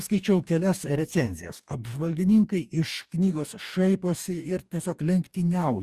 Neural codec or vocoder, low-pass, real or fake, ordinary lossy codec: codec, 44.1 kHz, 2.6 kbps, DAC; 14.4 kHz; fake; Opus, 64 kbps